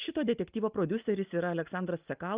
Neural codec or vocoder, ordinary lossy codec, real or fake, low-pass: none; Opus, 24 kbps; real; 3.6 kHz